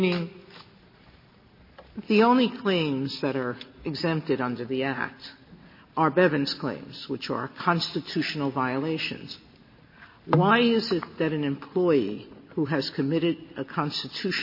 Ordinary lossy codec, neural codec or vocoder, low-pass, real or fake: MP3, 24 kbps; none; 5.4 kHz; real